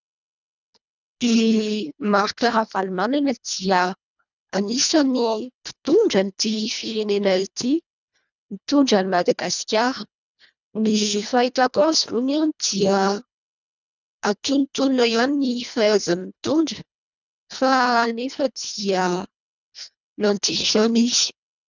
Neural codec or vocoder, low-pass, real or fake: codec, 24 kHz, 1.5 kbps, HILCodec; 7.2 kHz; fake